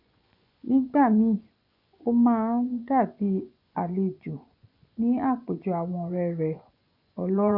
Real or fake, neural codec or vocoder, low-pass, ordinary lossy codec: fake; vocoder, 24 kHz, 100 mel bands, Vocos; 5.4 kHz; none